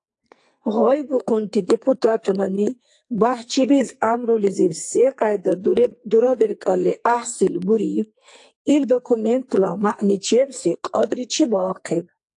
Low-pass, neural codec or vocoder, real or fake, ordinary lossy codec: 10.8 kHz; codec, 44.1 kHz, 2.6 kbps, SNAC; fake; AAC, 64 kbps